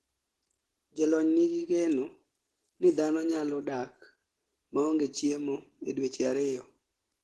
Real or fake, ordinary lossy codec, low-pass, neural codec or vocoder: real; Opus, 16 kbps; 10.8 kHz; none